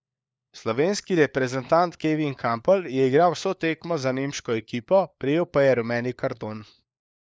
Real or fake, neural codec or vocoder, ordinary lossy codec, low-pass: fake; codec, 16 kHz, 4 kbps, FunCodec, trained on LibriTTS, 50 frames a second; none; none